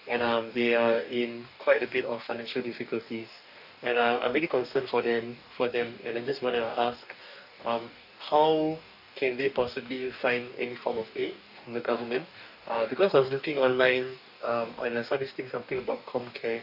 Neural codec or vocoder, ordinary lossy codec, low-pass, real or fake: codec, 44.1 kHz, 2.6 kbps, DAC; none; 5.4 kHz; fake